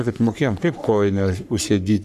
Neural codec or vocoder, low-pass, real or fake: codec, 44.1 kHz, 3.4 kbps, Pupu-Codec; 14.4 kHz; fake